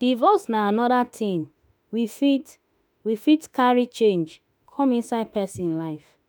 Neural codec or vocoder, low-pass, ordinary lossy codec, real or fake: autoencoder, 48 kHz, 32 numbers a frame, DAC-VAE, trained on Japanese speech; none; none; fake